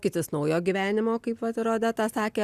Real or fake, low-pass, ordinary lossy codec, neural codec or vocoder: real; 14.4 kHz; Opus, 64 kbps; none